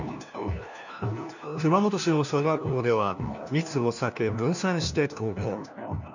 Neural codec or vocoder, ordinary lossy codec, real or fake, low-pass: codec, 16 kHz, 1 kbps, FunCodec, trained on LibriTTS, 50 frames a second; none; fake; 7.2 kHz